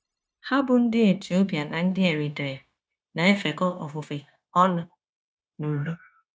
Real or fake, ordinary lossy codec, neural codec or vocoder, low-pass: fake; none; codec, 16 kHz, 0.9 kbps, LongCat-Audio-Codec; none